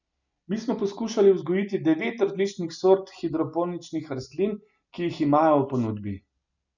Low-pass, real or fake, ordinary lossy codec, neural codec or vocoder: 7.2 kHz; real; none; none